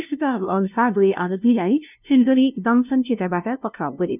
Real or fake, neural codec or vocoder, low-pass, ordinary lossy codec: fake; codec, 16 kHz, 0.5 kbps, FunCodec, trained on LibriTTS, 25 frames a second; 3.6 kHz; none